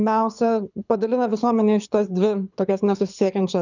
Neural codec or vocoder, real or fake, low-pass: codec, 24 kHz, 6 kbps, HILCodec; fake; 7.2 kHz